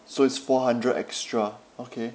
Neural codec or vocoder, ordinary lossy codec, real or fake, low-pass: none; none; real; none